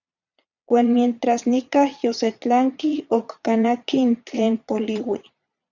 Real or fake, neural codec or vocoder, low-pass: fake; vocoder, 22.05 kHz, 80 mel bands, Vocos; 7.2 kHz